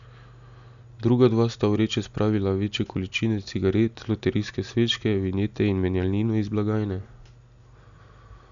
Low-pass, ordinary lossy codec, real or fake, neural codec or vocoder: 7.2 kHz; none; real; none